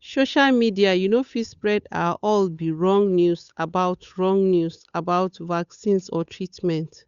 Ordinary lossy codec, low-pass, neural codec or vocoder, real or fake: Opus, 64 kbps; 7.2 kHz; codec, 16 kHz, 8 kbps, FunCodec, trained on Chinese and English, 25 frames a second; fake